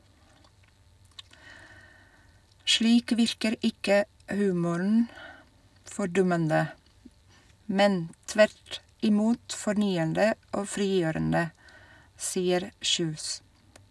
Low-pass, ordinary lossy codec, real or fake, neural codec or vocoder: none; none; real; none